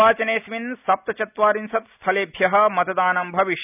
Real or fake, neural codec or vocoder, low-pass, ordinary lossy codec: real; none; 3.6 kHz; none